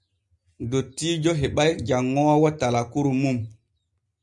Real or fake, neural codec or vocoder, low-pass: real; none; 10.8 kHz